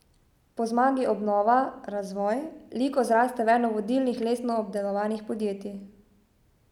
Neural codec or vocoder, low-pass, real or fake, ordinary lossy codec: none; 19.8 kHz; real; none